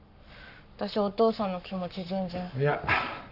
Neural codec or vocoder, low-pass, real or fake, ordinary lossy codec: codec, 44.1 kHz, 7.8 kbps, Pupu-Codec; 5.4 kHz; fake; none